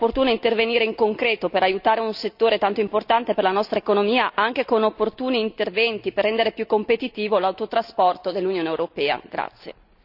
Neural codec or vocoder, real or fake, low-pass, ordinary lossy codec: none; real; 5.4 kHz; none